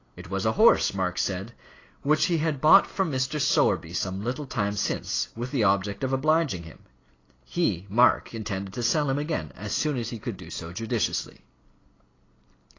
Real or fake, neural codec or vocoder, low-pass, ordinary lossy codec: real; none; 7.2 kHz; AAC, 32 kbps